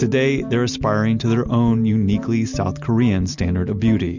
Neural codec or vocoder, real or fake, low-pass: none; real; 7.2 kHz